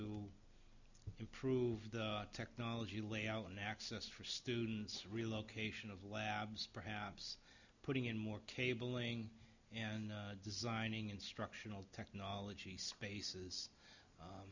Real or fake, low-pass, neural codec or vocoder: real; 7.2 kHz; none